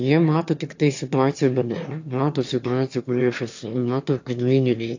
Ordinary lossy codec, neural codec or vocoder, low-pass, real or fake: AAC, 48 kbps; autoencoder, 22.05 kHz, a latent of 192 numbers a frame, VITS, trained on one speaker; 7.2 kHz; fake